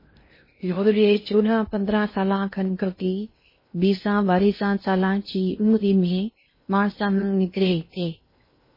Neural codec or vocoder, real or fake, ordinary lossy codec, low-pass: codec, 16 kHz in and 24 kHz out, 0.6 kbps, FocalCodec, streaming, 2048 codes; fake; MP3, 24 kbps; 5.4 kHz